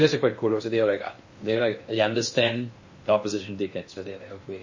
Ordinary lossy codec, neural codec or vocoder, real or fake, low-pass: MP3, 32 kbps; codec, 16 kHz in and 24 kHz out, 0.8 kbps, FocalCodec, streaming, 65536 codes; fake; 7.2 kHz